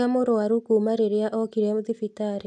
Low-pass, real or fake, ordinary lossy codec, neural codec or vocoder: none; real; none; none